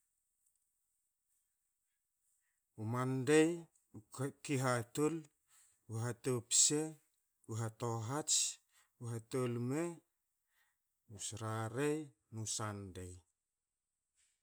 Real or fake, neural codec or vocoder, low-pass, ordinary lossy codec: real; none; none; none